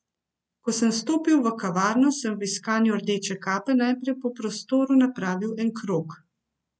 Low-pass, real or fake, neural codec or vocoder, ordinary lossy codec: none; real; none; none